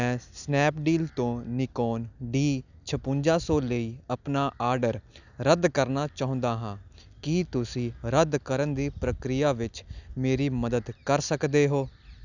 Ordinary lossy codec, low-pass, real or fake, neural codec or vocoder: none; 7.2 kHz; real; none